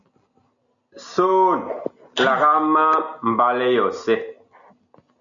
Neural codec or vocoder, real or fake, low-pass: none; real; 7.2 kHz